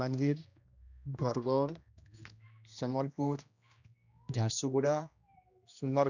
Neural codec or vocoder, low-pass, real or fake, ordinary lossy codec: codec, 16 kHz, 1 kbps, X-Codec, HuBERT features, trained on general audio; 7.2 kHz; fake; none